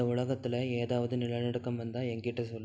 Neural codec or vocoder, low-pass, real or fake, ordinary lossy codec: none; none; real; none